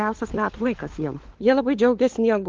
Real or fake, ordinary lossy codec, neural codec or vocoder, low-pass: fake; Opus, 16 kbps; codec, 16 kHz, 4 kbps, FunCodec, trained on LibriTTS, 50 frames a second; 7.2 kHz